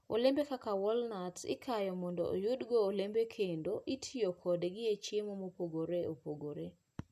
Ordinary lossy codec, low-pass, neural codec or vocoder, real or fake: none; 14.4 kHz; none; real